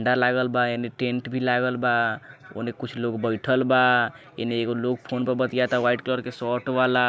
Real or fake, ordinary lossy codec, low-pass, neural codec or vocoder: real; none; none; none